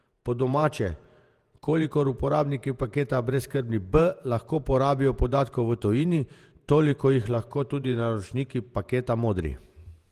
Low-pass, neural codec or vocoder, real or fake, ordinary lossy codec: 14.4 kHz; vocoder, 48 kHz, 128 mel bands, Vocos; fake; Opus, 24 kbps